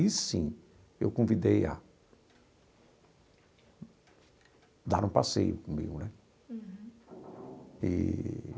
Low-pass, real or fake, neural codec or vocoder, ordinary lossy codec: none; real; none; none